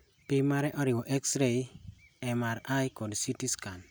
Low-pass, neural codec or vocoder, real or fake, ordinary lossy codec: none; none; real; none